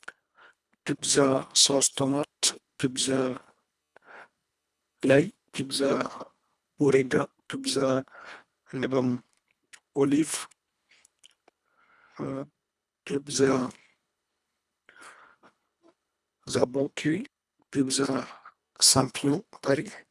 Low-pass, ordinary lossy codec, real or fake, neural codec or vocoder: none; none; fake; codec, 24 kHz, 1.5 kbps, HILCodec